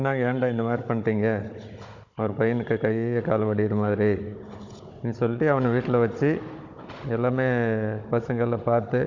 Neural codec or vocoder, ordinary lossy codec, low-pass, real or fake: codec, 16 kHz, 16 kbps, FunCodec, trained on Chinese and English, 50 frames a second; Opus, 64 kbps; 7.2 kHz; fake